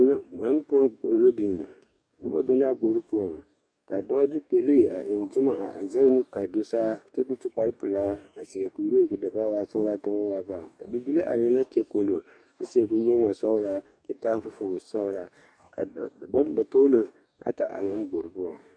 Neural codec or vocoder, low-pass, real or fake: codec, 44.1 kHz, 2.6 kbps, DAC; 9.9 kHz; fake